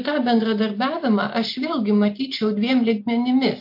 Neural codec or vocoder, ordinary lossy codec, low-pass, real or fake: none; MP3, 32 kbps; 5.4 kHz; real